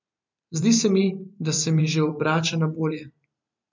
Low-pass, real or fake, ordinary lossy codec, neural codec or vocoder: 7.2 kHz; fake; MP3, 64 kbps; vocoder, 44.1 kHz, 128 mel bands every 512 samples, BigVGAN v2